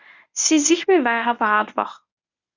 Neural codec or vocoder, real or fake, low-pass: codec, 24 kHz, 0.9 kbps, WavTokenizer, medium speech release version 1; fake; 7.2 kHz